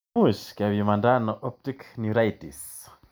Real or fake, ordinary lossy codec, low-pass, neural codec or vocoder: real; none; none; none